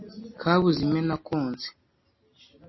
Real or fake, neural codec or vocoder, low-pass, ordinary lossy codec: real; none; 7.2 kHz; MP3, 24 kbps